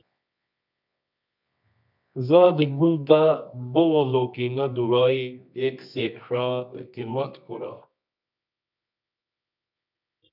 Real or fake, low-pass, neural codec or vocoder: fake; 5.4 kHz; codec, 24 kHz, 0.9 kbps, WavTokenizer, medium music audio release